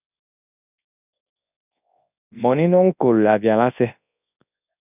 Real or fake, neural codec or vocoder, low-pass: fake; codec, 24 kHz, 0.9 kbps, WavTokenizer, large speech release; 3.6 kHz